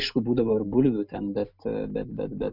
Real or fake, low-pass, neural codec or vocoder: real; 5.4 kHz; none